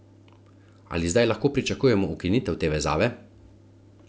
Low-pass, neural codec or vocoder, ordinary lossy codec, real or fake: none; none; none; real